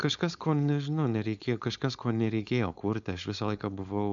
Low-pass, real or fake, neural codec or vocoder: 7.2 kHz; fake; codec, 16 kHz, 4.8 kbps, FACodec